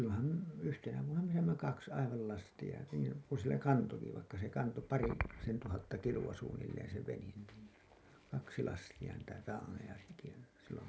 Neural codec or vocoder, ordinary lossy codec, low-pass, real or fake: none; none; none; real